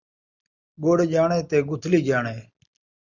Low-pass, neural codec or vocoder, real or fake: 7.2 kHz; none; real